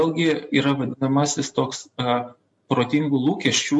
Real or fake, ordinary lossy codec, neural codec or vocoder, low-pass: real; MP3, 48 kbps; none; 10.8 kHz